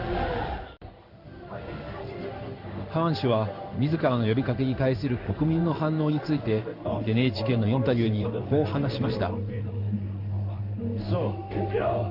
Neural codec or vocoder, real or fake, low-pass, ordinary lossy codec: codec, 16 kHz in and 24 kHz out, 1 kbps, XY-Tokenizer; fake; 5.4 kHz; none